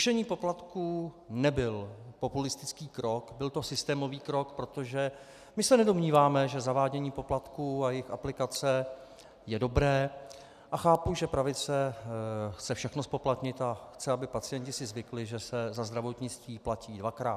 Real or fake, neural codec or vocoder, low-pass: real; none; 14.4 kHz